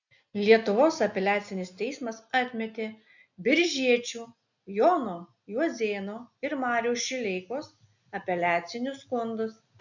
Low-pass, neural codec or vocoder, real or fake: 7.2 kHz; none; real